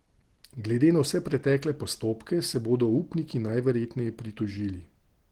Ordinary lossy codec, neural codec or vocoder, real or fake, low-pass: Opus, 16 kbps; none; real; 19.8 kHz